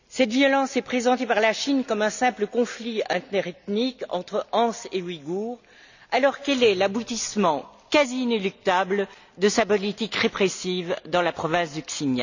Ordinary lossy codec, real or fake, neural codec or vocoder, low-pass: none; real; none; 7.2 kHz